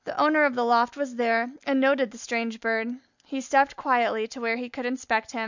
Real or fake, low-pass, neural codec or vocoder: real; 7.2 kHz; none